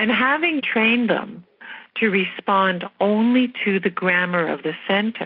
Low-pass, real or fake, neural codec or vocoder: 5.4 kHz; real; none